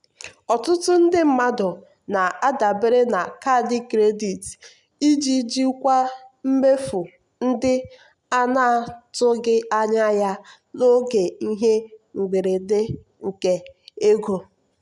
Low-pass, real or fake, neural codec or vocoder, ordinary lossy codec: 10.8 kHz; real; none; none